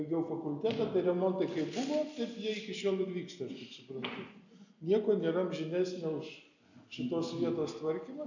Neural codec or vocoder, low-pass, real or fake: none; 7.2 kHz; real